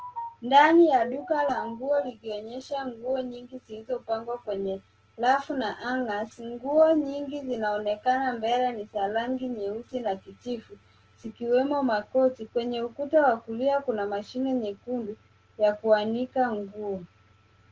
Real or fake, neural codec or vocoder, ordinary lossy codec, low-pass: real; none; Opus, 16 kbps; 7.2 kHz